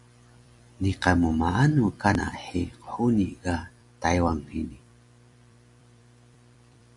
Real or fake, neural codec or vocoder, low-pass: fake; vocoder, 44.1 kHz, 128 mel bands every 256 samples, BigVGAN v2; 10.8 kHz